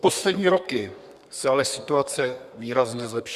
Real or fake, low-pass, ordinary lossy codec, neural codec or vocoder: fake; 14.4 kHz; Opus, 64 kbps; codec, 32 kHz, 1.9 kbps, SNAC